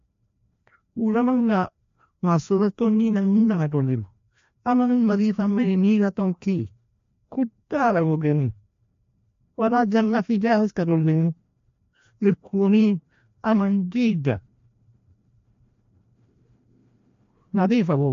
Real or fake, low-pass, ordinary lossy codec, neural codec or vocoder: fake; 7.2 kHz; MP3, 48 kbps; codec, 16 kHz, 1 kbps, FreqCodec, larger model